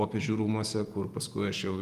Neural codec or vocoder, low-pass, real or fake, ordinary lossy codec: vocoder, 48 kHz, 128 mel bands, Vocos; 14.4 kHz; fake; Opus, 32 kbps